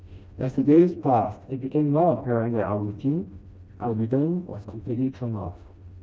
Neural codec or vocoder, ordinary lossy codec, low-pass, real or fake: codec, 16 kHz, 1 kbps, FreqCodec, smaller model; none; none; fake